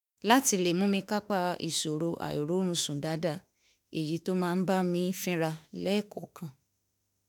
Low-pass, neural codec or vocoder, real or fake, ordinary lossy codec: none; autoencoder, 48 kHz, 32 numbers a frame, DAC-VAE, trained on Japanese speech; fake; none